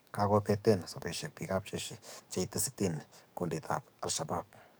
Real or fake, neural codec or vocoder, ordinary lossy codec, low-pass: fake; codec, 44.1 kHz, 7.8 kbps, DAC; none; none